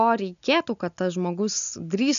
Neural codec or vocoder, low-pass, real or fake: none; 7.2 kHz; real